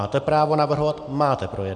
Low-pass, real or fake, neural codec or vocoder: 9.9 kHz; real; none